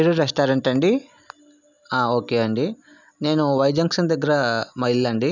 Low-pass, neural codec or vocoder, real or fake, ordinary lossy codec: 7.2 kHz; none; real; none